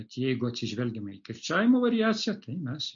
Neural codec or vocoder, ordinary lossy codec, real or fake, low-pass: none; MP3, 48 kbps; real; 7.2 kHz